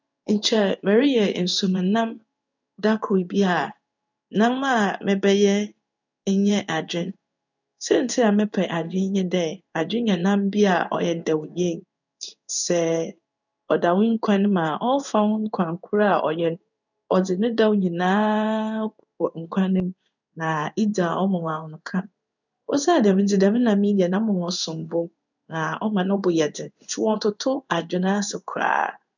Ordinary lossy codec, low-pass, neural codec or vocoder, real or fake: none; 7.2 kHz; codec, 16 kHz in and 24 kHz out, 1 kbps, XY-Tokenizer; fake